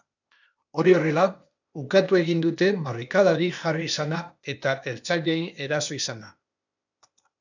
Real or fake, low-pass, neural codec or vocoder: fake; 7.2 kHz; codec, 16 kHz, 0.8 kbps, ZipCodec